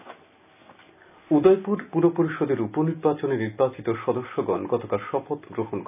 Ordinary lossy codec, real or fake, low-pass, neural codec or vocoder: none; real; 3.6 kHz; none